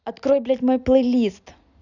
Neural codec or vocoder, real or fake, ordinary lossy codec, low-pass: none; real; none; 7.2 kHz